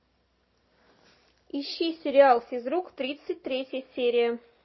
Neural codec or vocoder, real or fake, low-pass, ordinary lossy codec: none; real; 7.2 kHz; MP3, 24 kbps